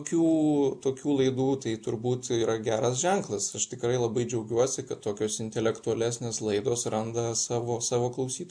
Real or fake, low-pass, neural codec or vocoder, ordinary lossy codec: real; 9.9 kHz; none; MP3, 64 kbps